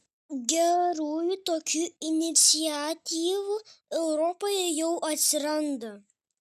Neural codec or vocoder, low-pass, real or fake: none; 14.4 kHz; real